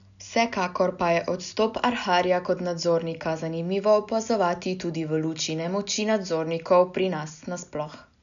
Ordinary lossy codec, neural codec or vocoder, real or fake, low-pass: none; none; real; 7.2 kHz